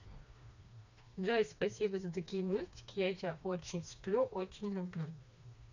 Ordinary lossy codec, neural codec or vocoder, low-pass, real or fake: none; codec, 16 kHz, 2 kbps, FreqCodec, smaller model; 7.2 kHz; fake